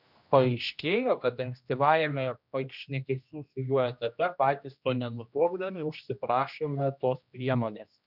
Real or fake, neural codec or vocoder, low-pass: fake; codec, 16 kHz, 1 kbps, X-Codec, HuBERT features, trained on general audio; 5.4 kHz